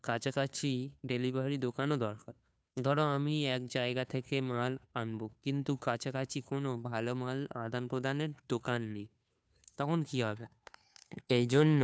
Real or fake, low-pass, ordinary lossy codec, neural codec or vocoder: fake; none; none; codec, 16 kHz, 2 kbps, FunCodec, trained on Chinese and English, 25 frames a second